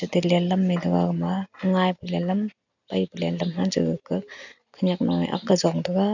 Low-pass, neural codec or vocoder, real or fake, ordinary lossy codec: 7.2 kHz; none; real; none